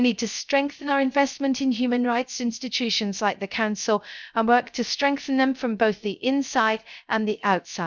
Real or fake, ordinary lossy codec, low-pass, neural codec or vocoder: fake; none; none; codec, 16 kHz, 0.3 kbps, FocalCodec